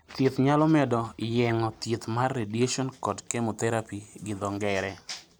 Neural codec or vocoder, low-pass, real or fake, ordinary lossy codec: none; none; real; none